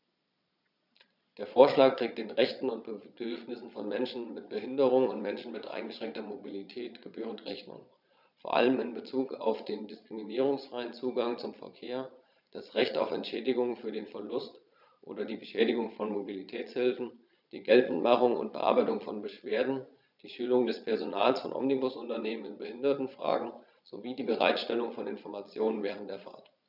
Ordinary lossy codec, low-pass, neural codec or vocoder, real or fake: none; 5.4 kHz; vocoder, 22.05 kHz, 80 mel bands, Vocos; fake